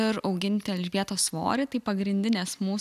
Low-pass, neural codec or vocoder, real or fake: 14.4 kHz; none; real